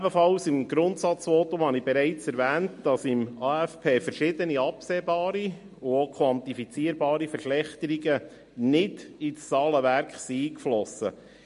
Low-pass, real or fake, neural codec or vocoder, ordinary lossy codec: 10.8 kHz; real; none; MP3, 48 kbps